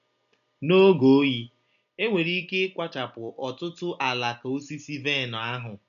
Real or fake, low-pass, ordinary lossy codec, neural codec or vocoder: real; 7.2 kHz; none; none